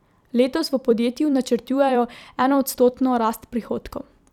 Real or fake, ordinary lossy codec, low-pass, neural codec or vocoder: fake; none; 19.8 kHz; vocoder, 44.1 kHz, 128 mel bands every 256 samples, BigVGAN v2